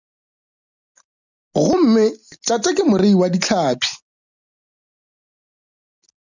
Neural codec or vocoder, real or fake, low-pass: none; real; 7.2 kHz